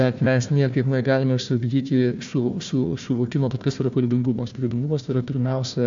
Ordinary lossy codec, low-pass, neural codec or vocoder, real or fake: AAC, 64 kbps; 7.2 kHz; codec, 16 kHz, 1 kbps, FunCodec, trained on Chinese and English, 50 frames a second; fake